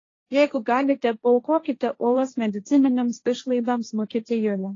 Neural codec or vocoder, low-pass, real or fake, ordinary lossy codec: codec, 16 kHz, 1.1 kbps, Voila-Tokenizer; 7.2 kHz; fake; AAC, 32 kbps